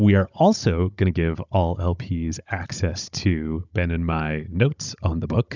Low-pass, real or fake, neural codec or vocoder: 7.2 kHz; fake; codec, 16 kHz, 16 kbps, FunCodec, trained on Chinese and English, 50 frames a second